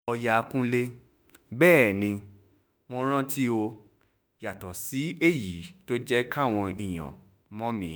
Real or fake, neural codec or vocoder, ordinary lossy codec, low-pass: fake; autoencoder, 48 kHz, 32 numbers a frame, DAC-VAE, trained on Japanese speech; none; none